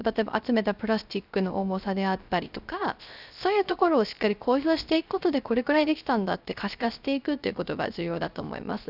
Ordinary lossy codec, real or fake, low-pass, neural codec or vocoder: none; fake; 5.4 kHz; codec, 16 kHz, 0.3 kbps, FocalCodec